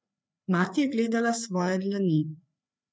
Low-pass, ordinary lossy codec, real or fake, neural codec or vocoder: none; none; fake; codec, 16 kHz, 4 kbps, FreqCodec, larger model